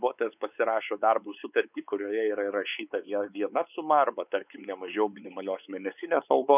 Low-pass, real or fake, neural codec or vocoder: 3.6 kHz; fake; codec, 16 kHz, 4 kbps, X-Codec, WavLM features, trained on Multilingual LibriSpeech